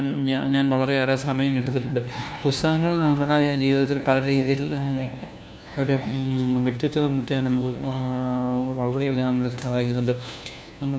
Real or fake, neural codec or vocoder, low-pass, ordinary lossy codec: fake; codec, 16 kHz, 1 kbps, FunCodec, trained on LibriTTS, 50 frames a second; none; none